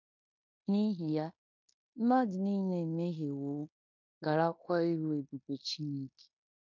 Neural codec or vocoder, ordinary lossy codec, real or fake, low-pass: codec, 16 kHz in and 24 kHz out, 0.9 kbps, LongCat-Audio-Codec, fine tuned four codebook decoder; MP3, 48 kbps; fake; 7.2 kHz